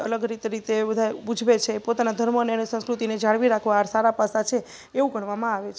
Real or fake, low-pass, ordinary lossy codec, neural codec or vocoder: real; none; none; none